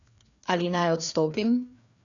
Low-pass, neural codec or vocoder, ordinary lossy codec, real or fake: 7.2 kHz; codec, 16 kHz, 2 kbps, FreqCodec, larger model; none; fake